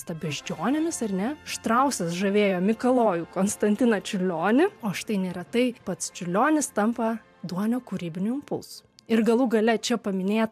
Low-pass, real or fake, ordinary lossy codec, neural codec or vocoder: 14.4 kHz; fake; AAC, 96 kbps; vocoder, 44.1 kHz, 128 mel bands every 512 samples, BigVGAN v2